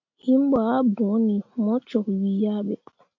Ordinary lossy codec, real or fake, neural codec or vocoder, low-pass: AAC, 48 kbps; real; none; 7.2 kHz